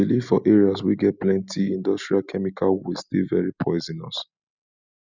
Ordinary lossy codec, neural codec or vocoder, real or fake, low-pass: none; none; real; 7.2 kHz